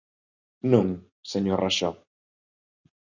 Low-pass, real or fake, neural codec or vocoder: 7.2 kHz; real; none